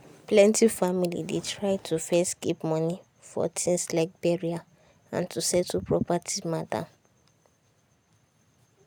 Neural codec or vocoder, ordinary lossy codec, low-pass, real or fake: none; none; none; real